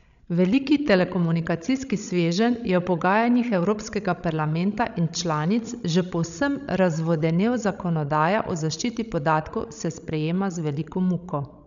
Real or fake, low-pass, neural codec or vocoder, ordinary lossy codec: fake; 7.2 kHz; codec, 16 kHz, 16 kbps, FreqCodec, larger model; none